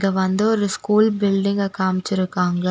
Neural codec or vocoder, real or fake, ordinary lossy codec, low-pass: none; real; none; none